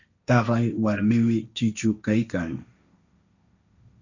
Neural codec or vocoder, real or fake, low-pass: codec, 16 kHz, 1.1 kbps, Voila-Tokenizer; fake; 7.2 kHz